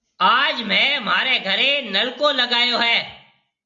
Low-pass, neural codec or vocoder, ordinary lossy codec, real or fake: 7.2 kHz; none; Opus, 64 kbps; real